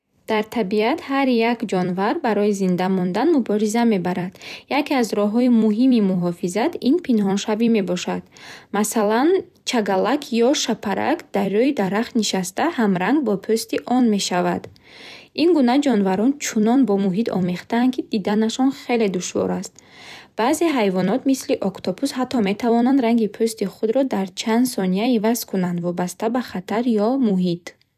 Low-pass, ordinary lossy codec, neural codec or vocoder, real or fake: 14.4 kHz; none; vocoder, 44.1 kHz, 128 mel bands every 256 samples, BigVGAN v2; fake